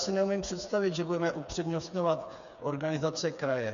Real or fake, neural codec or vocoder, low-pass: fake; codec, 16 kHz, 4 kbps, FreqCodec, smaller model; 7.2 kHz